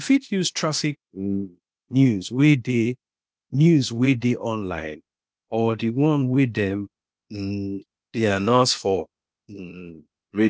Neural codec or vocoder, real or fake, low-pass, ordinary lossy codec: codec, 16 kHz, 0.8 kbps, ZipCodec; fake; none; none